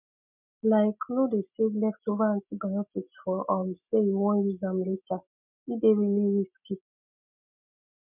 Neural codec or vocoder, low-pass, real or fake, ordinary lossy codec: none; 3.6 kHz; real; MP3, 32 kbps